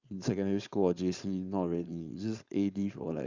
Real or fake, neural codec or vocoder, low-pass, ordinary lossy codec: fake; codec, 16 kHz, 4.8 kbps, FACodec; 7.2 kHz; Opus, 64 kbps